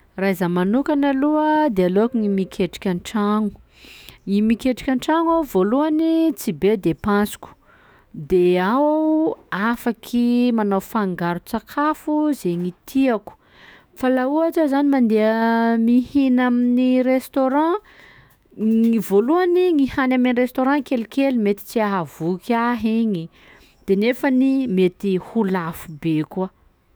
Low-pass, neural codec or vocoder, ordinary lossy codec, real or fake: none; autoencoder, 48 kHz, 128 numbers a frame, DAC-VAE, trained on Japanese speech; none; fake